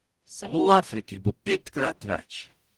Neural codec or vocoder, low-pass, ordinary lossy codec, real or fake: codec, 44.1 kHz, 0.9 kbps, DAC; 19.8 kHz; Opus, 24 kbps; fake